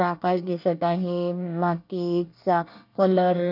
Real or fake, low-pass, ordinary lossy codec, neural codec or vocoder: fake; 5.4 kHz; none; codec, 24 kHz, 1 kbps, SNAC